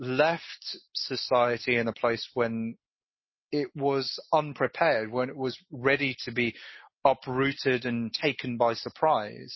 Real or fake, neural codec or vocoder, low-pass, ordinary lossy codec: real; none; 7.2 kHz; MP3, 24 kbps